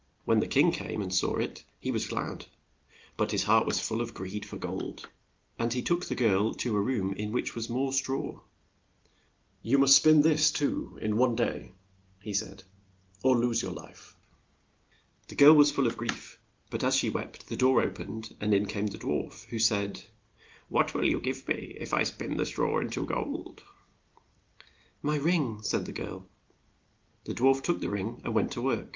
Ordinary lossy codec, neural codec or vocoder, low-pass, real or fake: Opus, 32 kbps; none; 7.2 kHz; real